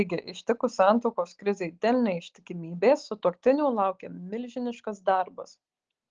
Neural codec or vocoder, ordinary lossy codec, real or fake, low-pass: none; Opus, 16 kbps; real; 7.2 kHz